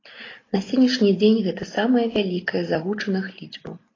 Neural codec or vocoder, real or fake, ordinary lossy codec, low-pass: none; real; AAC, 32 kbps; 7.2 kHz